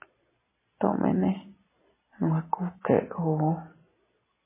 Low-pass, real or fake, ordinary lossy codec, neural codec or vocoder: 3.6 kHz; real; MP3, 16 kbps; none